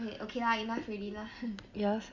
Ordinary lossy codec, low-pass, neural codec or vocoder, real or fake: Opus, 64 kbps; 7.2 kHz; none; real